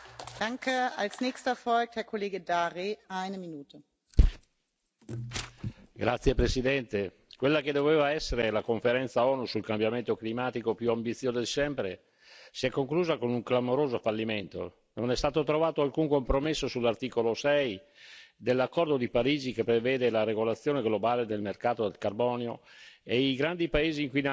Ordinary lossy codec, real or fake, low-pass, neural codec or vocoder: none; real; none; none